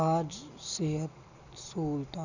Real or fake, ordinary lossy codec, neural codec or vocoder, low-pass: real; none; none; 7.2 kHz